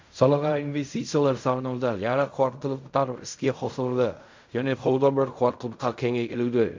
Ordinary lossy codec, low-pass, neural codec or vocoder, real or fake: MP3, 64 kbps; 7.2 kHz; codec, 16 kHz in and 24 kHz out, 0.4 kbps, LongCat-Audio-Codec, fine tuned four codebook decoder; fake